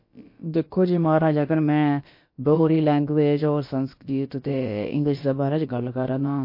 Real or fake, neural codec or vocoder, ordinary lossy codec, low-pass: fake; codec, 16 kHz, about 1 kbps, DyCAST, with the encoder's durations; MP3, 32 kbps; 5.4 kHz